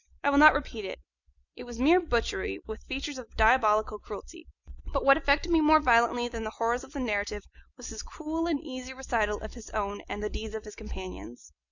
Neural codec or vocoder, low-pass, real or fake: none; 7.2 kHz; real